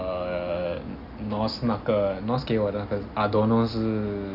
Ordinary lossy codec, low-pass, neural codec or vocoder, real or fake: none; 5.4 kHz; none; real